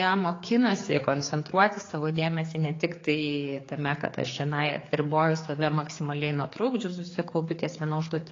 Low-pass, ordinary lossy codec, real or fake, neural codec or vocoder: 7.2 kHz; AAC, 32 kbps; fake; codec, 16 kHz, 4 kbps, X-Codec, HuBERT features, trained on general audio